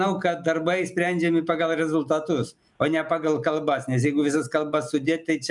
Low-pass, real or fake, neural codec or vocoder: 10.8 kHz; real; none